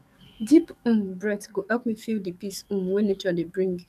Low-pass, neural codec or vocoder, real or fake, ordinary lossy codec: 14.4 kHz; codec, 44.1 kHz, 7.8 kbps, DAC; fake; none